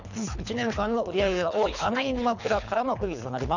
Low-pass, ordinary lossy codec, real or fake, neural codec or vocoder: 7.2 kHz; none; fake; codec, 24 kHz, 3 kbps, HILCodec